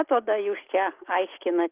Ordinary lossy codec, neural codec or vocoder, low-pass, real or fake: Opus, 24 kbps; none; 3.6 kHz; real